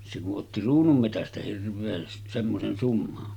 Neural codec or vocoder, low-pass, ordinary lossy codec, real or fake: none; 19.8 kHz; none; real